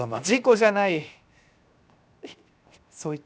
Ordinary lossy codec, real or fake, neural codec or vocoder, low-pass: none; fake; codec, 16 kHz, 0.7 kbps, FocalCodec; none